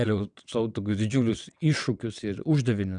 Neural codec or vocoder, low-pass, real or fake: vocoder, 22.05 kHz, 80 mel bands, WaveNeXt; 9.9 kHz; fake